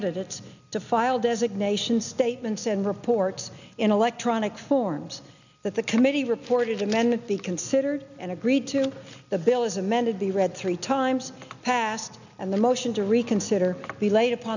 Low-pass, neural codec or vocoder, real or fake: 7.2 kHz; none; real